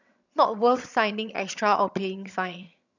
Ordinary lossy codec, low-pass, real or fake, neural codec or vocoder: none; 7.2 kHz; fake; vocoder, 22.05 kHz, 80 mel bands, HiFi-GAN